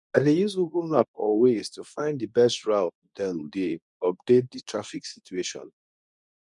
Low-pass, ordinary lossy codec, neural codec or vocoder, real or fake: 10.8 kHz; none; codec, 24 kHz, 0.9 kbps, WavTokenizer, medium speech release version 2; fake